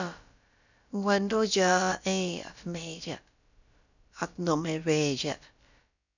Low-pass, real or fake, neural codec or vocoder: 7.2 kHz; fake; codec, 16 kHz, about 1 kbps, DyCAST, with the encoder's durations